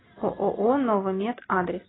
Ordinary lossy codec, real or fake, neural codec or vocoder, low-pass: AAC, 16 kbps; real; none; 7.2 kHz